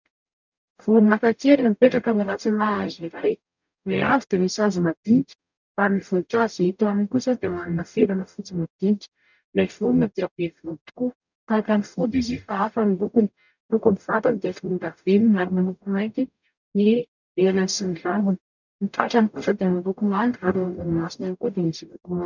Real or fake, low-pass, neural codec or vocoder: fake; 7.2 kHz; codec, 44.1 kHz, 0.9 kbps, DAC